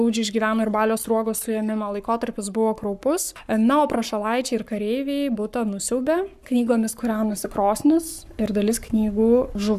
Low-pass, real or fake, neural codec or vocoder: 14.4 kHz; fake; codec, 44.1 kHz, 7.8 kbps, Pupu-Codec